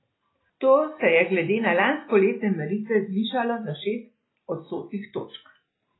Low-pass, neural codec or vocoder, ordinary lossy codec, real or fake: 7.2 kHz; none; AAC, 16 kbps; real